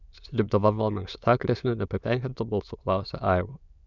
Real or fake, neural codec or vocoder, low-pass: fake; autoencoder, 22.05 kHz, a latent of 192 numbers a frame, VITS, trained on many speakers; 7.2 kHz